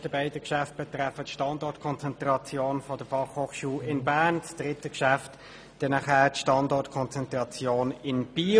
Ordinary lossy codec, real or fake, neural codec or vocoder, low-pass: none; real; none; none